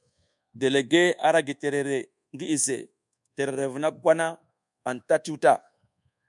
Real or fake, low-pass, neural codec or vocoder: fake; 10.8 kHz; codec, 24 kHz, 1.2 kbps, DualCodec